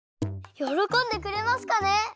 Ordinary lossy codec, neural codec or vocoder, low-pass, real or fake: none; none; none; real